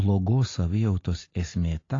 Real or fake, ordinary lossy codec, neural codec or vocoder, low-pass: real; AAC, 32 kbps; none; 7.2 kHz